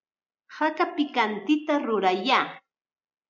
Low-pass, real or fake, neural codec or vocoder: 7.2 kHz; real; none